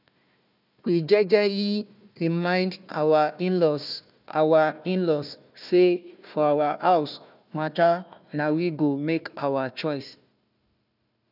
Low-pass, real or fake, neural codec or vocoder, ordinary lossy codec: 5.4 kHz; fake; codec, 16 kHz, 1 kbps, FunCodec, trained on Chinese and English, 50 frames a second; none